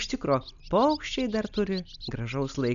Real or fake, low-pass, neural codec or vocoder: real; 7.2 kHz; none